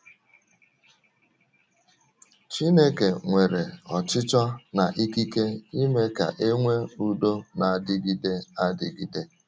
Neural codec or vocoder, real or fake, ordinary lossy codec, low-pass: none; real; none; none